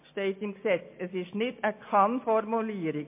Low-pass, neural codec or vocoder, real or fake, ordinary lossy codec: 3.6 kHz; none; real; MP3, 24 kbps